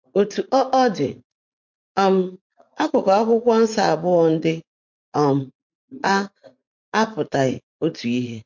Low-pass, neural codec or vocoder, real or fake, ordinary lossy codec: 7.2 kHz; vocoder, 44.1 kHz, 80 mel bands, Vocos; fake; MP3, 48 kbps